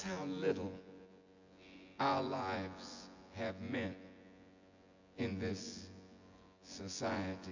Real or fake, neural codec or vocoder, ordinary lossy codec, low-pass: fake; vocoder, 24 kHz, 100 mel bands, Vocos; AAC, 48 kbps; 7.2 kHz